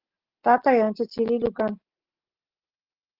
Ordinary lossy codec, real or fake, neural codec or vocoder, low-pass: Opus, 16 kbps; real; none; 5.4 kHz